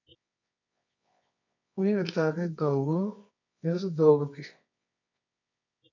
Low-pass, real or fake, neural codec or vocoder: 7.2 kHz; fake; codec, 24 kHz, 0.9 kbps, WavTokenizer, medium music audio release